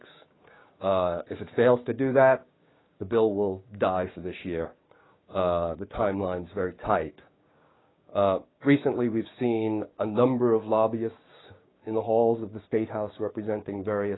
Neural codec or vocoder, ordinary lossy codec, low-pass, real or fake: codec, 16 kHz, 6 kbps, DAC; AAC, 16 kbps; 7.2 kHz; fake